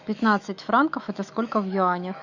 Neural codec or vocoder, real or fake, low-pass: autoencoder, 48 kHz, 128 numbers a frame, DAC-VAE, trained on Japanese speech; fake; 7.2 kHz